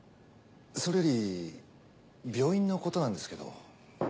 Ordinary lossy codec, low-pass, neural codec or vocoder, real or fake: none; none; none; real